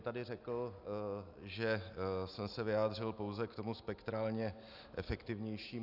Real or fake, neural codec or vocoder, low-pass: real; none; 5.4 kHz